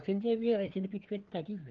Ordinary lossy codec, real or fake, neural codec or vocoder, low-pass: Opus, 16 kbps; fake; codec, 16 kHz, 4 kbps, FreqCodec, larger model; 7.2 kHz